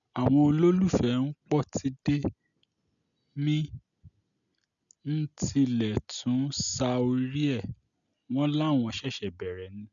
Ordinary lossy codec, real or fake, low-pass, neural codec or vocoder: none; real; 7.2 kHz; none